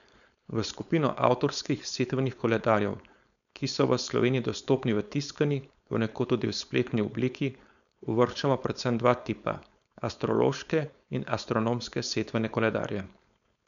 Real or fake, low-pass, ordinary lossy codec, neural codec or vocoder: fake; 7.2 kHz; none; codec, 16 kHz, 4.8 kbps, FACodec